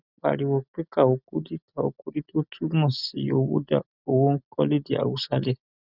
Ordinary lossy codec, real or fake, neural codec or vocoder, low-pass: none; real; none; 5.4 kHz